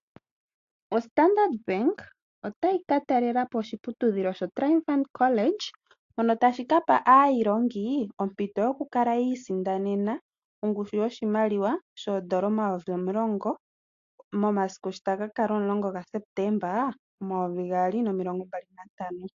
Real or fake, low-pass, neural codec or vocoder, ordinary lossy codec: real; 7.2 kHz; none; AAC, 48 kbps